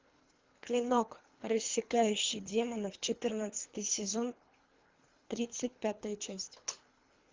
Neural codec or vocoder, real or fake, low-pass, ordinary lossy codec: codec, 24 kHz, 3 kbps, HILCodec; fake; 7.2 kHz; Opus, 24 kbps